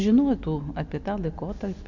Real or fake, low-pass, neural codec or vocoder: real; 7.2 kHz; none